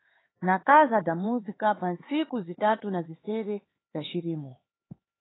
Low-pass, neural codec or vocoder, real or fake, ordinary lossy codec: 7.2 kHz; codec, 16 kHz, 4 kbps, X-Codec, HuBERT features, trained on LibriSpeech; fake; AAC, 16 kbps